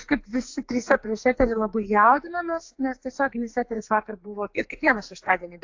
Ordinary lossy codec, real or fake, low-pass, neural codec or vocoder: AAC, 48 kbps; fake; 7.2 kHz; codec, 44.1 kHz, 2.6 kbps, SNAC